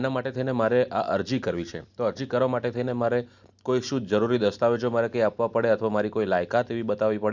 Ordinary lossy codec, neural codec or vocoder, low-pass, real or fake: none; none; 7.2 kHz; real